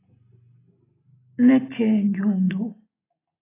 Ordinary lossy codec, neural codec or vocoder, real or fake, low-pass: AAC, 16 kbps; none; real; 3.6 kHz